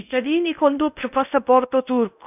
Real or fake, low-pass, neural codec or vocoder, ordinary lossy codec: fake; 3.6 kHz; codec, 16 kHz in and 24 kHz out, 0.8 kbps, FocalCodec, streaming, 65536 codes; none